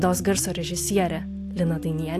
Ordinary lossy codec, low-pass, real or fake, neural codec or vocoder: AAC, 64 kbps; 14.4 kHz; real; none